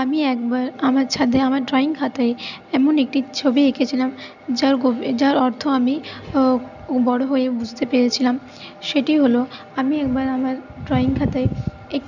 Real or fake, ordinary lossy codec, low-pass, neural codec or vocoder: real; none; 7.2 kHz; none